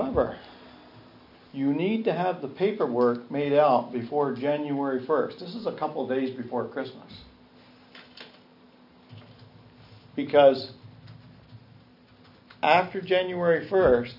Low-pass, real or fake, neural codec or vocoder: 5.4 kHz; real; none